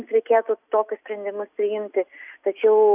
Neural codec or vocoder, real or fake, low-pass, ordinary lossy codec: none; real; 3.6 kHz; AAC, 32 kbps